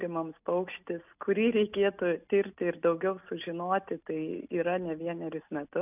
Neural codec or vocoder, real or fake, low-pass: none; real; 3.6 kHz